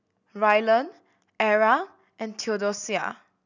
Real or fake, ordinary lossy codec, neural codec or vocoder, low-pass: real; none; none; 7.2 kHz